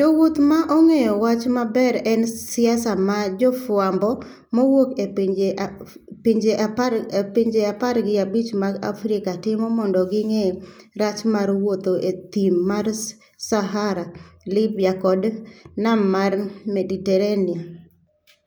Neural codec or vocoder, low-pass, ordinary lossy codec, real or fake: none; none; none; real